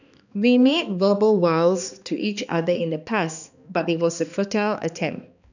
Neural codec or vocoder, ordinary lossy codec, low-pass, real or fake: codec, 16 kHz, 2 kbps, X-Codec, HuBERT features, trained on balanced general audio; none; 7.2 kHz; fake